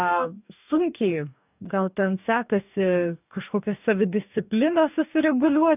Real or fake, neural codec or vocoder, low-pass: fake; codec, 44.1 kHz, 2.6 kbps, DAC; 3.6 kHz